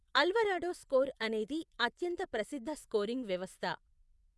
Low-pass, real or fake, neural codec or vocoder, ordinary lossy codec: none; fake; vocoder, 24 kHz, 100 mel bands, Vocos; none